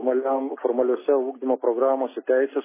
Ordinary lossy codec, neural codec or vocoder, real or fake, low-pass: MP3, 16 kbps; none; real; 3.6 kHz